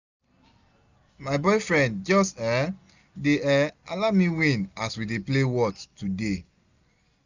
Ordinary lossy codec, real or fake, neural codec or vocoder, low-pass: none; real; none; 7.2 kHz